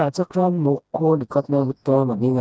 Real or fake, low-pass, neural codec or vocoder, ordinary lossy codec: fake; none; codec, 16 kHz, 1 kbps, FreqCodec, smaller model; none